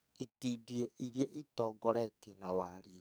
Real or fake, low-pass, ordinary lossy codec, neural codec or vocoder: fake; none; none; codec, 44.1 kHz, 2.6 kbps, SNAC